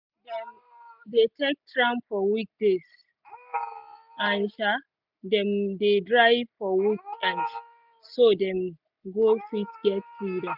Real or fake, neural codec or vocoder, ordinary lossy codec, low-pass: real; none; none; 5.4 kHz